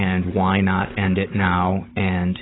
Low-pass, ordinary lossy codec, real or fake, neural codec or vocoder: 7.2 kHz; AAC, 16 kbps; fake; codec, 16 kHz, 16 kbps, FunCodec, trained on Chinese and English, 50 frames a second